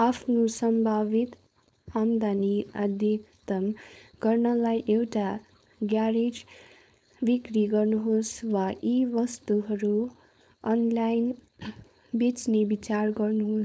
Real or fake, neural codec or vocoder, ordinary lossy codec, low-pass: fake; codec, 16 kHz, 4.8 kbps, FACodec; none; none